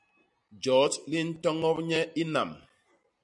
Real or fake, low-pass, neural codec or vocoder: real; 10.8 kHz; none